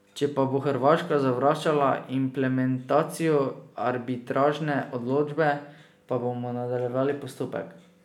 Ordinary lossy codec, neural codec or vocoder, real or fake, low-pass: none; none; real; 19.8 kHz